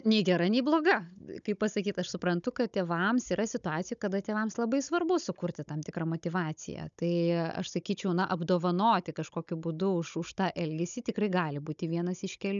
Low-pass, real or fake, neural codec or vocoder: 7.2 kHz; fake; codec, 16 kHz, 16 kbps, FunCodec, trained on Chinese and English, 50 frames a second